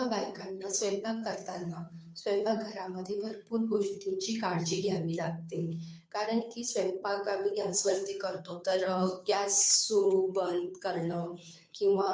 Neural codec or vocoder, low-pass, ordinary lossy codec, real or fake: codec, 16 kHz, 2 kbps, FunCodec, trained on Chinese and English, 25 frames a second; none; none; fake